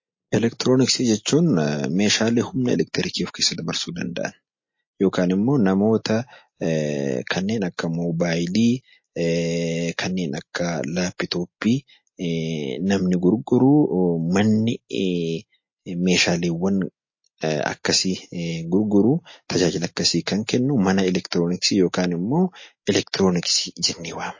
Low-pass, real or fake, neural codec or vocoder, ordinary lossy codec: 7.2 kHz; real; none; MP3, 32 kbps